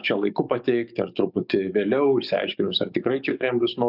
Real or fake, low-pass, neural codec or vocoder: fake; 5.4 kHz; codec, 44.1 kHz, 7.8 kbps, DAC